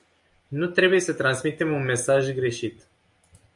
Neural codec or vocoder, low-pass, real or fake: none; 10.8 kHz; real